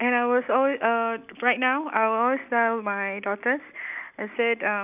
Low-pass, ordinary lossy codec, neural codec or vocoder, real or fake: 3.6 kHz; none; none; real